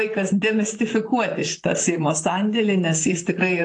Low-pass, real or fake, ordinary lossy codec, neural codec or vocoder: 10.8 kHz; fake; AAC, 48 kbps; vocoder, 44.1 kHz, 128 mel bands, Pupu-Vocoder